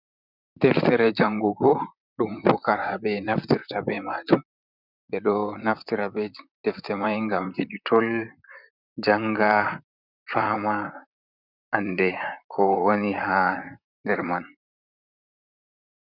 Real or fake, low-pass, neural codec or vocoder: fake; 5.4 kHz; vocoder, 44.1 kHz, 128 mel bands, Pupu-Vocoder